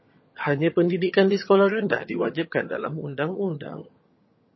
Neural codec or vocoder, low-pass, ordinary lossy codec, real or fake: vocoder, 22.05 kHz, 80 mel bands, HiFi-GAN; 7.2 kHz; MP3, 24 kbps; fake